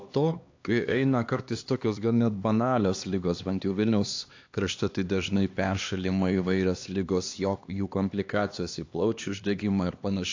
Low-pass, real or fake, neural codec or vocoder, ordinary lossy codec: 7.2 kHz; fake; codec, 16 kHz, 2 kbps, X-Codec, HuBERT features, trained on LibriSpeech; AAC, 48 kbps